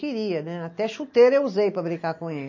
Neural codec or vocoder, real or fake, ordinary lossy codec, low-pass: none; real; MP3, 32 kbps; 7.2 kHz